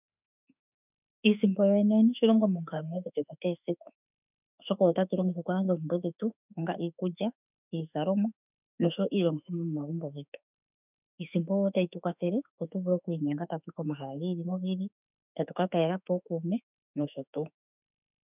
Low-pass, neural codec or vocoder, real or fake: 3.6 kHz; autoencoder, 48 kHz, 32 numbers a frame, DAC-VAE, trained on Japanese speech; fake